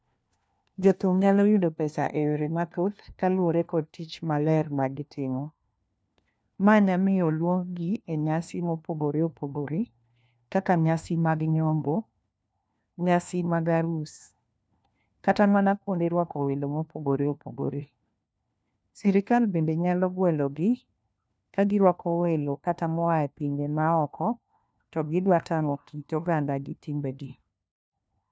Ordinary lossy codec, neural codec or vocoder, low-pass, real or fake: none; codec, 16 kHz, 1 kbps, FunCodec, trained on LibriTTS, 50 frames a second; none; fake